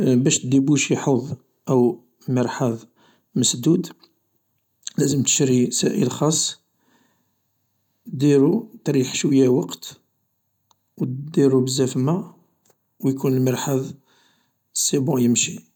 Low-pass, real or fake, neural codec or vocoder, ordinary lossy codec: 19.8 kHz; real; none; none